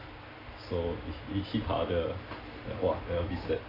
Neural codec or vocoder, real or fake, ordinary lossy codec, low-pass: none; real; AAC, 24 kbps; 5.4 kHz